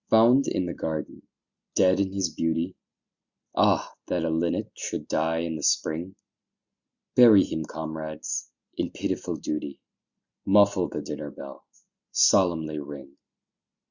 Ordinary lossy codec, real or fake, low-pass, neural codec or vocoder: Opus, 64 kbps; real; 7.2 kHz; none